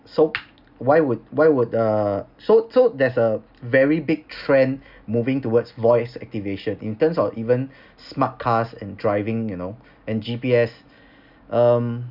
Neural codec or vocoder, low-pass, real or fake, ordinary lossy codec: none; 5.4 kHz; real; none